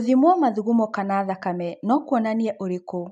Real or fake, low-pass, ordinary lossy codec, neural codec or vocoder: real; 10.8 kHz; none; none